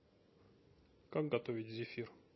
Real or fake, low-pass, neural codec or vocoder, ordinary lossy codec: real; 7.2 kHz; none; MP3, 24 kbps